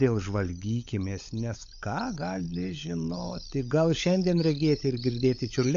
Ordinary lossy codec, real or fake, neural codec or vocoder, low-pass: MP3, 64 kbps; fake; codec, 16 kHz, 16 kbps, FunCodec, trained on LibriTTS, 50 frames a second; 7.2 kHz